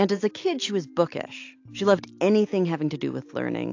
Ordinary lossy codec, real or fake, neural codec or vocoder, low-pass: AAC, 48 kbps; real; none; 7.2 kHz